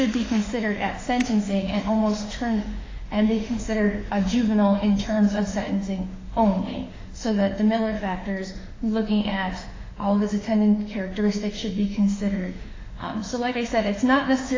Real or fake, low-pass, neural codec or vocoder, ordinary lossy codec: fake; 7.2 kHz; autoencoder, 48 kHz, 32 numbers a frame, DAC-VAE, trained on Japanese speech; AAC, 32 kbps